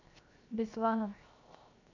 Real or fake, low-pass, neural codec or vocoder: fake; 7.2 kHz; codec, 16 kHz, 0.7 kbps, FocalCodec